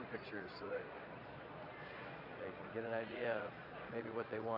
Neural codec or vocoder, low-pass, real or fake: vocoder, 22.05 kHz, 80 mel bands, Vocos; 5.4 kHz; fake